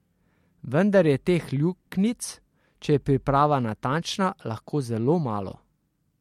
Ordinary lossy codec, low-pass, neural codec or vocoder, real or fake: MP3, 64 kbps; 19.8 kHz; none; real